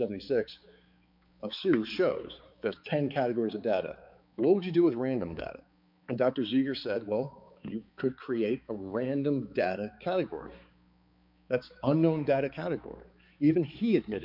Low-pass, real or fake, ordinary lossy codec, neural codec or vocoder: 5.4 kHz; fake; MP3, 48 kbps; codec, 16 kHz, 4 kbps, X-Codec, HuBERT features, trained on balanced general audio